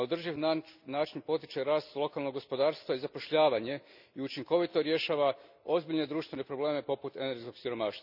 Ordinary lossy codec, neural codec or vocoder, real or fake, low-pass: none; none; real; 5.4 kHz